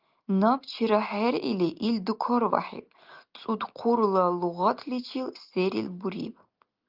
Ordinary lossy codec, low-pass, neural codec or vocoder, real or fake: Opus, 24 kbps; 5.4 kHz; none; real